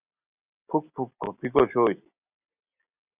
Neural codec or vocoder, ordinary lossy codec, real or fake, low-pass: none; Opus, 64 kbps; real; 3.6 kHz